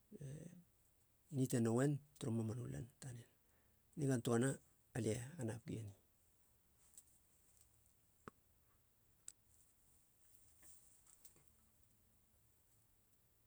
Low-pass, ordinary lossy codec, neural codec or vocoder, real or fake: none; none; none; real